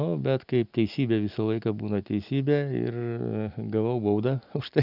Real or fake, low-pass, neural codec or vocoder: real; 5.4 kHz; none